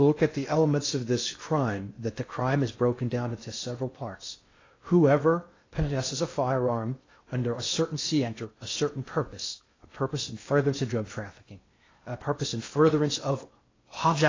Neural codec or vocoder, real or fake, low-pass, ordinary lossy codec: codec, 16 kHz in and 24 kHz out, 0.6 kbps, FocalCodec, streaming, 2048 codes; fake; 7.2 kHz; AAC, 32 kbps